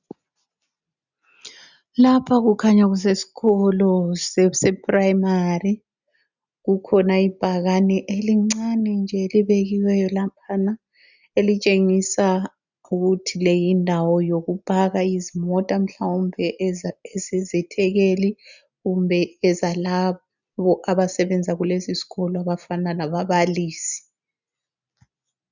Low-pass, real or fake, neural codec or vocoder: 7.2 kHz; real; none